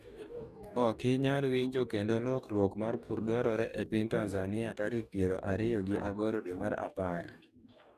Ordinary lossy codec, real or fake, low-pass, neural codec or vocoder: none; fake; 14.4 kHz; codec, 44.1 kHz, 2.6 kbps, DAC